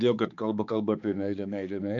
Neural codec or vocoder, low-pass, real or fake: codec, 16 kHz, 4 kbps, X-Codec, HuBERT features, trained on balanced general audio; 7.2 kHz; fake